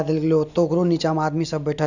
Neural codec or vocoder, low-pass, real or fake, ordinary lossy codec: none; 7.2 kHz; real; none